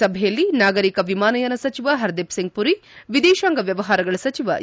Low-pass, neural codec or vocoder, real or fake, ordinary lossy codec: none; none; real; none